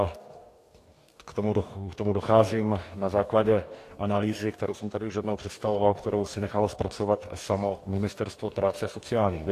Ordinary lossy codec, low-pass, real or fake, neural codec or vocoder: AAC, 64 kbps; 14.4 kHz; fake; codec, 44.1 kHz, 2.6 kbps, DAC